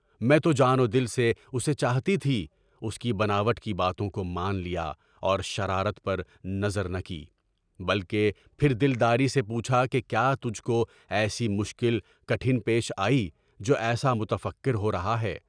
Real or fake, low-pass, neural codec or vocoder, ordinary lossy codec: real; 9.9 kHz; none; none